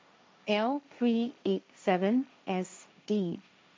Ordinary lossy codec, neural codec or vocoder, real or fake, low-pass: none; codec, 16 kHz, 1.1 kbps, Voila-Tokenizer; fake; none